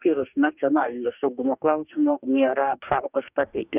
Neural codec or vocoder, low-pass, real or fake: codec, 44.1 kHz, 2.6 kbps, DAC; 3.6 kHz; fake